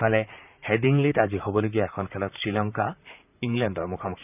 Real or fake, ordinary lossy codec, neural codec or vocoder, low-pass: fake; none; vocoder, 44.1 kHz, 128 mel bands, Pupu-Vocoder; 3.6 kHz